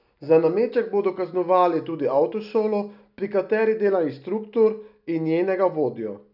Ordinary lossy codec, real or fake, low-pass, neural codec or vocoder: none; real; 5.4 kHz; none